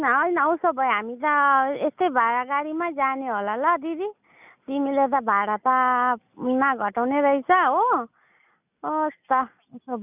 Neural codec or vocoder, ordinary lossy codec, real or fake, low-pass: none; none; real; 3.6 kHz